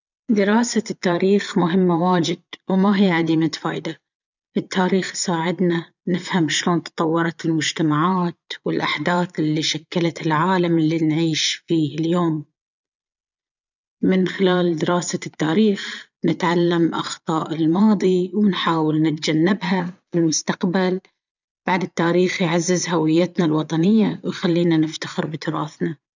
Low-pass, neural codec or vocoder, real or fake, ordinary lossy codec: 7.2 kHz; vocoder, 22.05 kHz, 80 mel bands, Vocos; fake; none